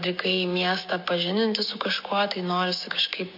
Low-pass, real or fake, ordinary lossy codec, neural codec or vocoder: 5.4 kHz; fake; MP3, 32 kbps; codec, 16 kHz, 6 kbps, DAC